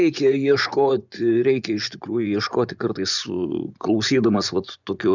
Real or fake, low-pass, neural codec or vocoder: fake; 7.2 kHz; vocoder, 24 kHz, 100 mel bands, Vocos